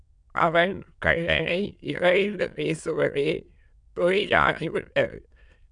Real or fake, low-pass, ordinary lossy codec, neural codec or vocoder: fake; 9.9 kHz; MP3, 96 kbps; autoencoder, 22.05 kHz, a latent of 192 numbers a frame, VITS, trained on many speakers